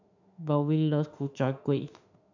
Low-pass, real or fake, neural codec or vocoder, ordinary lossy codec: 7.2 kHz; fake; autoencoder, 48 kHz, 32 numbers a frame, DAC-VAE, trained on Japanese speech; none